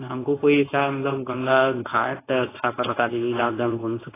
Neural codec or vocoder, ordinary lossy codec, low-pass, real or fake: codec, 24 kHz, 0.9 kbps, WavTokenizer, medium speech release version 1; AAC, 16 kbps; 3.6 kHz; fake